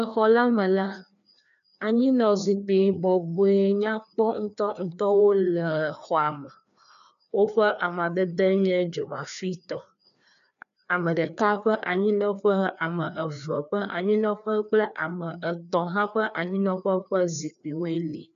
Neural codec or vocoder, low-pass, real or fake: codec, 16 kHz, 2 kbps, FreqCodec, larger model; 7.2 kHz; fake